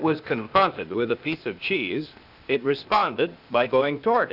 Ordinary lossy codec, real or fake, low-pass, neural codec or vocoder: Opus, 64 kbps; fake; 5.4 kHz; codec, 16 kHz, 0.8 kbps, ZipCodec